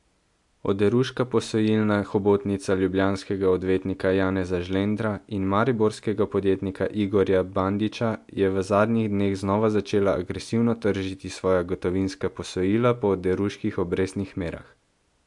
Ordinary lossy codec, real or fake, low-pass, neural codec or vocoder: MP3, 64 kbps; real; 10.8 kHz; none